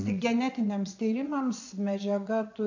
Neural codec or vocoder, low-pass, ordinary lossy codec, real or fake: vocoder, 22.05 kHz, 80 mel bands, Vocos; 7.2 kHz; AAC, 48 kbps; fake